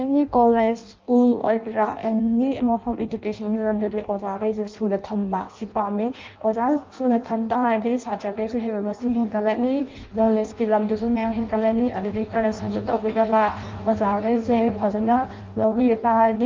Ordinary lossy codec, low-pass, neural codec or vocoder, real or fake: Opus, 24 kbps; 7.2 kHz; codec, 16 kHz in and 24 kHz out, 0.6 kbps, FireRedTTS-2 codec; fake